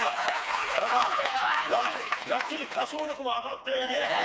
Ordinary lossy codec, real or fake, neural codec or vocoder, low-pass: none; fake; codec, 16 kHz, 2 kbps, FreqCodec, smaller model; none